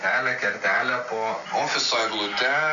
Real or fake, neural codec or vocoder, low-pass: real; none; 7.2 kHz